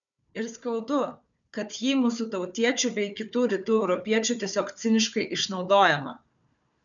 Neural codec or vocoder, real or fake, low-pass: codec, 16 kHz, 4 kbps, FunCodec, trained on Chinese and English, 50 frames a second; fake; 7.2 kHz